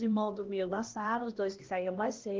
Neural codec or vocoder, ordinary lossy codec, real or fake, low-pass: codec, 16 kHz, 1 kbps, X-Codec, HuBERT features, trained on LibriSpeech; Opus, 16 kbps; fake; 7.2 kHz